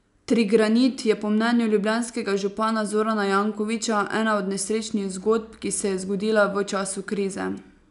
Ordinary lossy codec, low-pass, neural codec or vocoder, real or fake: none; 10.8 kHz; none; real